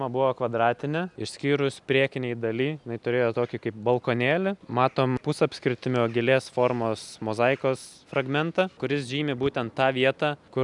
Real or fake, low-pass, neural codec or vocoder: real; 10.8 kHz; none